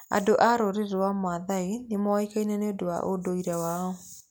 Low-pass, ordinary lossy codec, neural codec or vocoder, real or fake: none; none; none; real